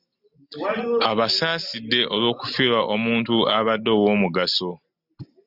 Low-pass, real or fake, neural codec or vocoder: 5.4 kHz; real; none